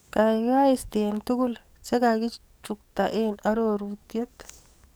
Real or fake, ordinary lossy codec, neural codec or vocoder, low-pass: fake; none; codec, 44.1 kHz, 7.8 kbps, DAC; none